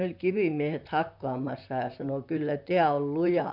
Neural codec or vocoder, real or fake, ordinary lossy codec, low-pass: vocoder, 44.1 kHz, 128 mel bands, Pupu-Vocoder; fake; none; 5.4 kHz